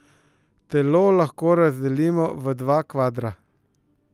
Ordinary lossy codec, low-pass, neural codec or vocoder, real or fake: Opus, 32 kbps; 14.4 kHz; none; real